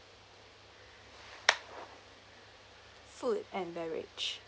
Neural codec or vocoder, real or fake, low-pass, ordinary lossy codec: none; real; none; none